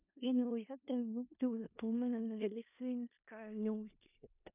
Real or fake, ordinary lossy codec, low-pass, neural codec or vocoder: fake; none; 3.6 kHz; codec, 16 kHz in and 24 kHz out, 0.4 kbps, LongCat-Audio-Codec, four codebook decoder